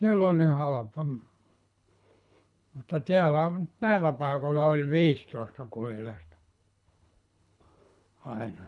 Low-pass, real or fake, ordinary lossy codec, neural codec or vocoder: 10.8 kHz; fake; none; codec, 24 kHz, 3 kbps, HILCodec